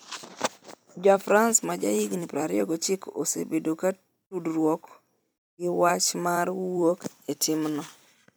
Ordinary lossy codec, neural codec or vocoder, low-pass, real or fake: none; vocoder, 44.1 kHz, 128 mel bands every 256 samples, BigVGAN v2; none; fake